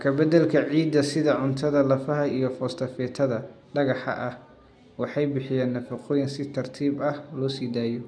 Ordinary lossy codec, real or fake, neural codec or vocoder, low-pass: none; real; none; none